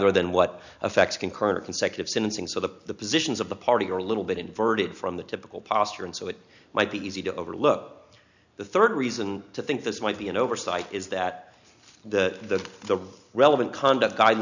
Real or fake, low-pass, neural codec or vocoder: real; 7.2 kHz; none